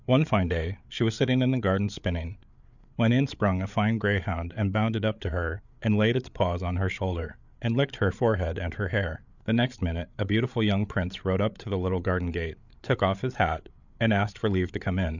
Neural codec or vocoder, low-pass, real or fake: codec, 16 kHz, 8 kbps, FreqCodec, larger model; 7.2 kHz; fake